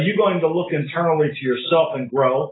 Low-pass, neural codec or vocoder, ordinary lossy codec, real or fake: 7.2 kHz; none; AAC, 16 kbps; real